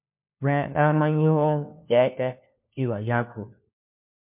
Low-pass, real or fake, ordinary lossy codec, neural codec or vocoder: 3.6 kHz; fake; MP3, 32 kbps; codec, 16 kHz, 1 kbps, FunCodec, trained on LibriTTS, 50 frames a second